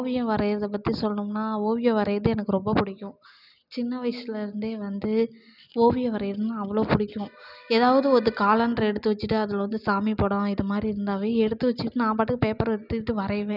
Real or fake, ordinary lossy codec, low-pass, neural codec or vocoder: real; none; 5.4 kHz; none